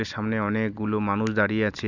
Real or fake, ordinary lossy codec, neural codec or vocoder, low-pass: real; none; none; 7.2 kHz